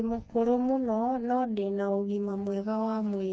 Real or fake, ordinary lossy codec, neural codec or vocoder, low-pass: fake; none; codec, 16 kHz, 2 kbps, FreqCodec, smaller model; none